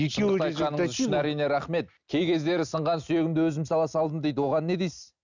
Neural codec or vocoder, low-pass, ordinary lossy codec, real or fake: none; 7.2 kHz; none; real